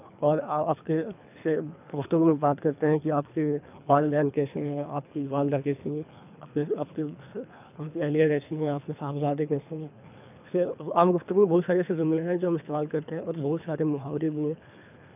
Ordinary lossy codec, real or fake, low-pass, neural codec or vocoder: none; fake; 3.6 kHz; codec, 24 kHz, 3 kbps, HILCodec